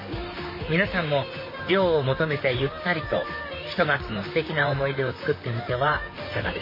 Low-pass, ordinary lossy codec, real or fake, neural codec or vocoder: 5.4 kHz; MP3, 24 kbps; fake; vocoder, 44.1 kHz, 128 mel bands, Pupu-Vocoder